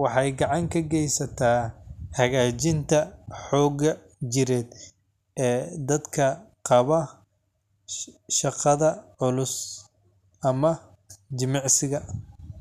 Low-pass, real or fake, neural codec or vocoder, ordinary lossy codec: 14.4 kHz; real; none; none